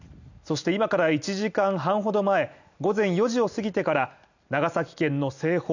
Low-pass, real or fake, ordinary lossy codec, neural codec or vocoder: 7.2 kHz; real; none; none